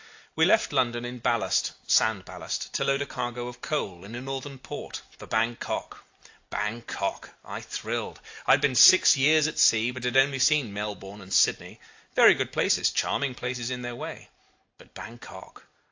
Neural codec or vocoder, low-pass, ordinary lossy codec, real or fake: none; 7.2 kHz; AAC, 48 kbps; real